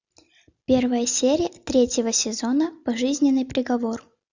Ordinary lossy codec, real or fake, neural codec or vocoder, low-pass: Opus, 64 kbps; real; none; 7.2 kHz